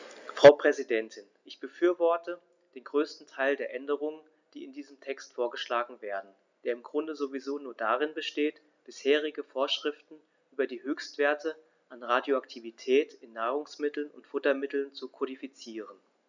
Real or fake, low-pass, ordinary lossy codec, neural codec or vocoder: real; 7.2 kHz; none; none